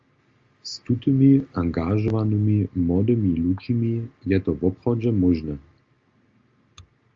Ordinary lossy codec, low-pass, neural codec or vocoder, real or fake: Opus, 32 kbps; 7.2 kHz; none; real